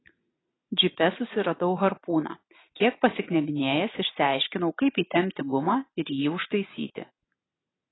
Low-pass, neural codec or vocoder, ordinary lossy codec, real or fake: 7.2 kHz; none; AAC, 16 kbps; real